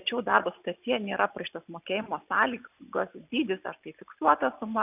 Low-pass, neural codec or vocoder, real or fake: 3.6 kHz; none; real